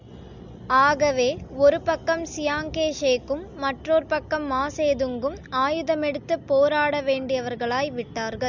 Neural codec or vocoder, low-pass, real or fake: none; 7.2 kHz; real